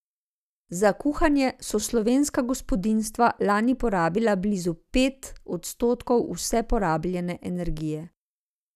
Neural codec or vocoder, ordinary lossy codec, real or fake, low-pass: none; none; real; 14.4 kHz